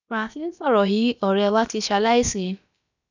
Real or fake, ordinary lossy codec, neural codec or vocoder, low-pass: fake; none; codec, 16 kHz, about 1 kbps, DyCAST, with the encoder's durations; 7.2 kHz